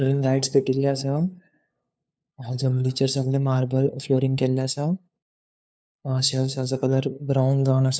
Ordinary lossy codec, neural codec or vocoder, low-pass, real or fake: none; codec, 16 kHz, 2 kbps, FunCodec, trained on LibriTTS, 25 frames a second; none; fake